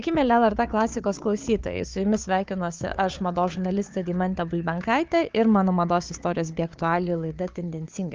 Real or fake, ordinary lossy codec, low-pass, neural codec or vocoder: fake; Opus, 32 kbps; 7.2 kHz; codec, 16 kHz, 4 kbps, FunCodec, trained on Chinese and English, 50 frames a second